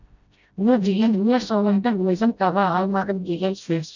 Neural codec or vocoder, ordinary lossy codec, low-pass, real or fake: codec, 16 kHz, 0.5 kbps, FreqCodec, smaller model; none; 7.2 kHz; fake